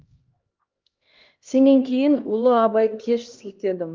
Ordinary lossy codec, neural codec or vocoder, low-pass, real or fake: Opus, 32 kbps; codec, 16 kHz, 1 kbps, X-Codec, HuBERT features, trained on LibriSpeech; 7.2 kHz; fake